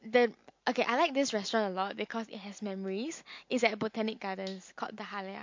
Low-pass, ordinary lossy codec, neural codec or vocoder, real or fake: 7.2 kHz; MP3, 48 kbps; none; real